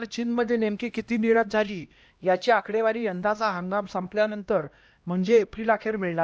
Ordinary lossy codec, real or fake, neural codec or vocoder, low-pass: none; fake; codec, 16 kHz, 1 kbps, X-Codec, HuBERT features, trained on LibriSpeech; none